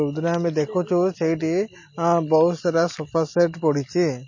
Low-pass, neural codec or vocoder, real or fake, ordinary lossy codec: 7.2 kHz; none; real; MP3, 32 kbps